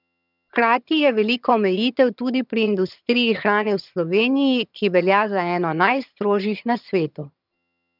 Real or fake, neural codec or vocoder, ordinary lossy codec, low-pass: fake; vocoder, 22.05 kHz, 80 mel bands, HiFi-GAN; none; 5.4 kHz